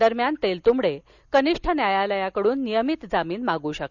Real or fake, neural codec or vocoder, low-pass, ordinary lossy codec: real; none; 7.2 kHz; none